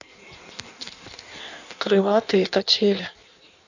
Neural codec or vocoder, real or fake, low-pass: codec, 16 kHz in and 24 kHz out, 1.1 kbps, FireRedTTS-2 codec; fake; 7.2 kHz